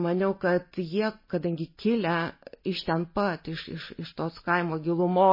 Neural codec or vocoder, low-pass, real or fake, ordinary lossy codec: none; 5.4 kHz; real; MP3, 24 kbps